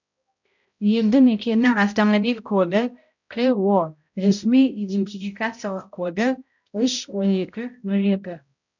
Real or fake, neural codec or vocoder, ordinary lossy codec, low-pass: fake; codec, 16 kHz, 0.5 kbps, X-Codec, HuBERT features, trained on balanced general audio; none; 7.2 kHz